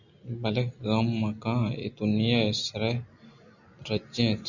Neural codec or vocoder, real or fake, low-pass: none; real; 7.2 kHz